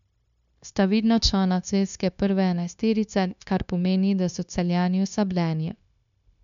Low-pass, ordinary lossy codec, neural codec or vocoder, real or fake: 7.2 kHz; none; codec, 16 kHz, 0.9 kbps, LongCat-Audio-Codec; fake